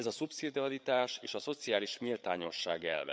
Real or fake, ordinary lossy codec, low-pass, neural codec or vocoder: fake; none; none; codec, 16 kHz, 8 kbps, FreqCodec, larger model